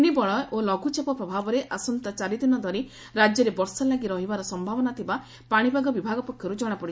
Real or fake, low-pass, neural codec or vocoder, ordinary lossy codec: real; none; none; none